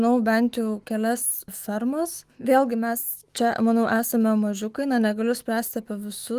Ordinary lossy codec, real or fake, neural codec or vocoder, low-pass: Opus, 24 kbps; fake; autoencoder, 48 kHz, 128 numbers a frame, DAC-VAE, trained on Japanese speech; 14.4 kHz